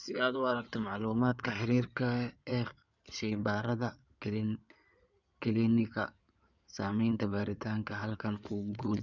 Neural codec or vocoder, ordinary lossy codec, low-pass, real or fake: codec, 16 kHz in and 24 kHz out, 2.2 kbps, FireRedTTS-2 codec; none; 7.2 kHz; fake